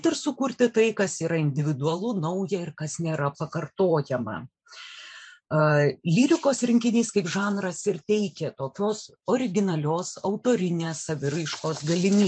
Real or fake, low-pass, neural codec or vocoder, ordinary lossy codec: fake; 9.9 kHz; vocoder, 44.1 kHz, 128 mel bands every 512 samples, BigVGAN v2; MP3, 64 kbps